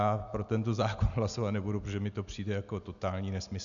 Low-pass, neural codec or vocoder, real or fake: 7.2 kHz; none; real